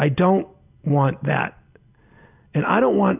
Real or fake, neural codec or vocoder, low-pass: real; none; 3.6 kHz